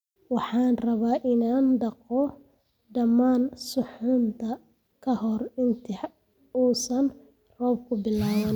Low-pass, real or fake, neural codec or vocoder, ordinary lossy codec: none; real; none; none